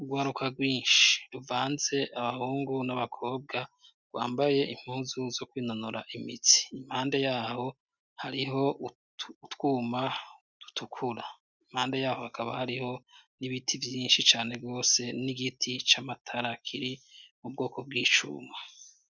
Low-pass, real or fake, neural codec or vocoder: 7.2 kHz; real; none